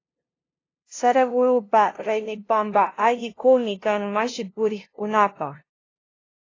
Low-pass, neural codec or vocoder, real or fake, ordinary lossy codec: 7.2 kHz; codec, 16 kHz, 0.5 kbps, FunCodec, trained on LibriTTS, 25 frames a second; fake; AAC, 32 kbps